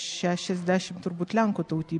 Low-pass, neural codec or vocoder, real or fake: 10.8 kHz; none; real